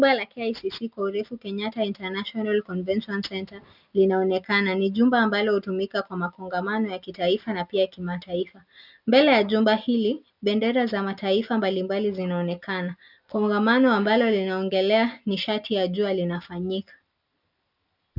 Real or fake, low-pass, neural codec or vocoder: real; 5.4 kHz; none